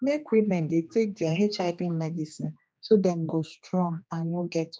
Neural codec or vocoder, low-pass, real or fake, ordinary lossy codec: codec, 16 kHz, 2 kbps, X-Codec, HuBERT features, trained on general audio; none; fake; none